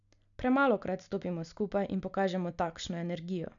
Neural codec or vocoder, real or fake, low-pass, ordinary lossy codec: none; real; 7.2 kHz; none